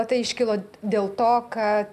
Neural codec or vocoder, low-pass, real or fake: none; 14.4 kHz; real